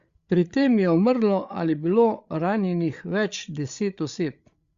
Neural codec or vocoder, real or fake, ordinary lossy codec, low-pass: codec, 16 kHz, 8 kbps, FreqCodec, larger model; fake; Opus, 64 kbps; 7.2 kHz